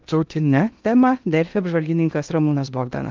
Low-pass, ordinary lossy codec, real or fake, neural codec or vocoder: 7.2 kHz; Opus, 24 kbps; fake; codec, 16 kHz in and 24 kHz out, 0.6 kbps, FocalCodec, streaming, 2048 codes